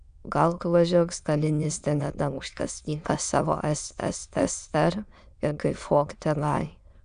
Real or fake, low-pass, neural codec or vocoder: fake; 9.9 kHz; autoencoder, 22.05 kHz, a latent of 192 numbers a frame, VITS, trained on many speakers